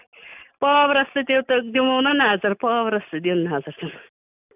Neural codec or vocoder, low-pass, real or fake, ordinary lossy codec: none; 3.6 kHz; real; none